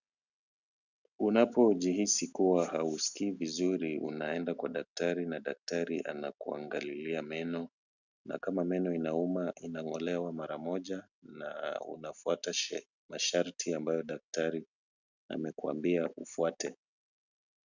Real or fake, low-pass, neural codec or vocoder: real; 7.2 kHz; none